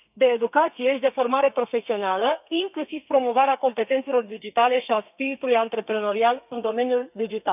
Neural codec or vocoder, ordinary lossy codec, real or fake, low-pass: codec, 32 kHz, 1.9 kbps, SNAC; none; fake; 3.6 kHz